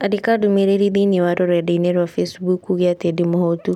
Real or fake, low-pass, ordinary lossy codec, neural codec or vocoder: real; 19.8 kHz; none; none